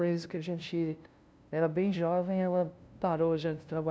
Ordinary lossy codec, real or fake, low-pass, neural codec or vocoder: none; fake; none; codec, 16 kHz, 0.5 kbps, FunCodec, trained on LibriTTS, 25 frames a second